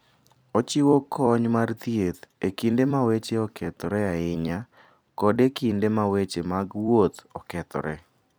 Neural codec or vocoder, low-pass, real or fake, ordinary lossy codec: vocoder, 44.1 kHz, 128 mel bands every 256 samples, BigVGAN v2; none; fake; none